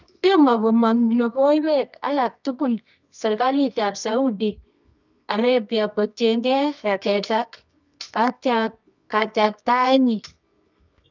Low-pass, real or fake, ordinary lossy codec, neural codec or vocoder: 7.2 kHz; fake; none; codec, 24 kHz, 0.9 kbps, WavTokenizer, medium music audio release